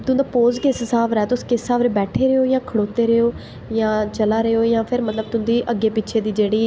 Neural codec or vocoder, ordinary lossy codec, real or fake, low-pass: none; none; real; none